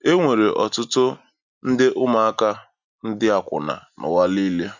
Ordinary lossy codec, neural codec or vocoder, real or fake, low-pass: none; none; real; 7.2 kHz